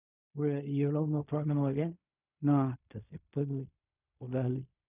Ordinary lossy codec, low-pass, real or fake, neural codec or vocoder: none; 3.6 kHz; fake; codec, 16 kHz in and 24 kHz out, 0.4 kbps, LongCat-Audio-Codec, fine tuned four codebook decoder